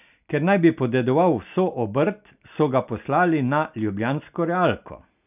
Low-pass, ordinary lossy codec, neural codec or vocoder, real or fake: 3.6 kHz; none; none; real